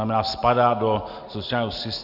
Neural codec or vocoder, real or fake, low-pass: none; real; 5.4 kHz